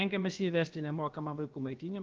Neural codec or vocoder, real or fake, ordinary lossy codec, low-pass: codec, 16 kHz, about 1 kbps, DyCAST, with the encoder's durations; fake; Opus, 24 kbps; 7.2 kHz